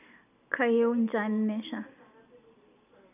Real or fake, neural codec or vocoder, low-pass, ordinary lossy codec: real; none; 3.6 kHz; none